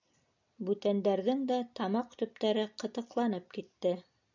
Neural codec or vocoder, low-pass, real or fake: none; 7.2 kHz; real